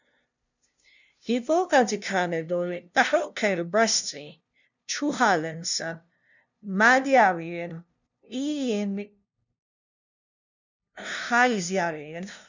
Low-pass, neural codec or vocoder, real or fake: 7.2 kHz; codec, 16 kHz, 0.5 kbps, FunCodec, trained on LibriTTS, 25 frames a second; fake